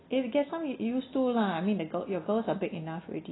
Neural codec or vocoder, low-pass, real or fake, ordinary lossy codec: none; 7.2 kHz; real; AAC, 16 kbps